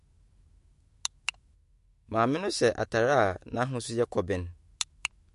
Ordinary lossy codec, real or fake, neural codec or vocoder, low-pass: MP3, 48 kbps; fake; autoencoder, 48 kHz, 128 numbers a frame, DAC-VAE, trained on Japanese speech; 14.4 kHz